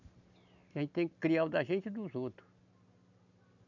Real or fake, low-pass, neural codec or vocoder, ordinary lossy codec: real; 7.2 kHz; none; none